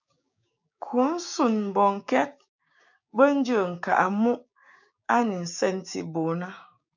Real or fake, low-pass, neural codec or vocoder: fake; 7.2 kHz; codec, 16 kHz, 6 kbps, DAC